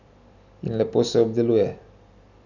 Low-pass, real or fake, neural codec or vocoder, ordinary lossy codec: 7.2 kHz; real; none; none